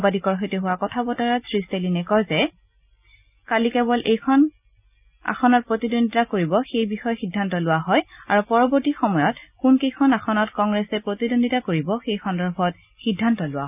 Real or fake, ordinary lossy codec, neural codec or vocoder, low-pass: real; none; none; 3.6 kHz